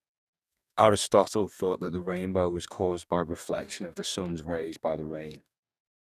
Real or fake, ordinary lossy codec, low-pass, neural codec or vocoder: fake; none; 14.4 kHz; codec, 44.1 kHz, 2.6 kbps, DAC